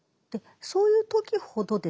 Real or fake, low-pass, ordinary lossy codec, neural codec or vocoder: real; none; none; none